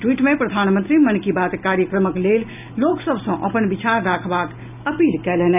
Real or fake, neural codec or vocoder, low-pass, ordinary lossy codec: real; none; 3.6 kHz; none